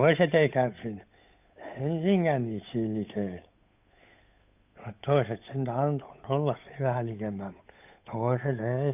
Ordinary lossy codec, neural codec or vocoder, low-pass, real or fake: none; codec, 16 kHz, 8 kbps, FunCodec, trained on Chinese and English, 25 frames a second; 3.6 kHz; fake